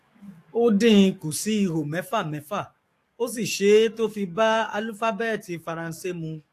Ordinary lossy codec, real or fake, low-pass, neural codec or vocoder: AAC, 64 kbps; fake; 14.4 kHz; codec, 44.1 kHz, 7.8 kbps, DAC